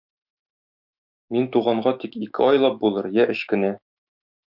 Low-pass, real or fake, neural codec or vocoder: 5.4 kHz; fake; autoencoder, 48 kHz, 128 numbers a frame, DAC-VAE, trained on Japanese speech